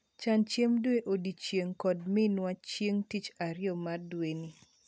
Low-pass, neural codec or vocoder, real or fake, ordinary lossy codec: none; none; real; none